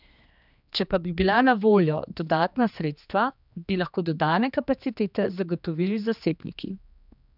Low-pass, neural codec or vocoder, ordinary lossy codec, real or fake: 5.4 kHz; codec, 16 kHz, 2 kbps, X-Codec, HuBERT features, trained on general audio; none; fake